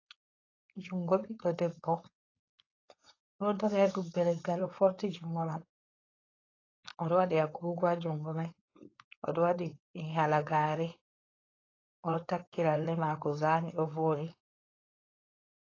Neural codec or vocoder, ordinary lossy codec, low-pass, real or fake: codec, 16 kHz, 4.8 kbps, FACodec; AAC, 32 kbps; 7.2 kHz; fake